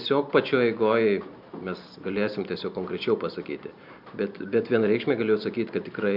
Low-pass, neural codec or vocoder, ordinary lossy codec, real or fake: 5.4 kHz; none; MP3, 48 kbps; real